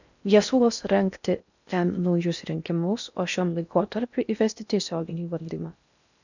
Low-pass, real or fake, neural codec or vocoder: 7.2 kHz; fake; codec, 16 kHz in and 24 kHz out, 0.6 kbps, FocalCodec, streaming, 4096 codes